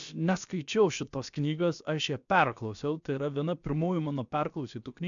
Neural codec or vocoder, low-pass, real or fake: codec, 16 kHz, about 1 kbps, DyCAST, with the encoder's durations; 7.2 kHz; fake